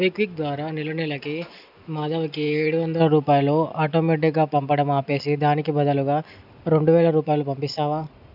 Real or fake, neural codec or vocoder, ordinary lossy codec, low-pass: real; none; none; 5.4 kHz